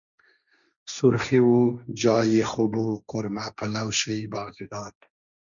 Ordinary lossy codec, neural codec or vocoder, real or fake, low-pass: MP3, 96 kbps; codec, 16 kHz, 1.1 kbps, Voila-Tokenizer; fake; 7.2 kHz